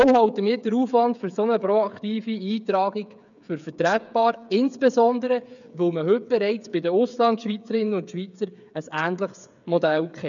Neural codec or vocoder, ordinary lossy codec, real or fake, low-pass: codec, 16 kHz, 8 kbps, FreqCodec, smaller model; none; fake; 7.2 kHz